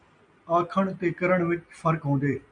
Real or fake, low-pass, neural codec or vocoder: real; 9.9 kHz; none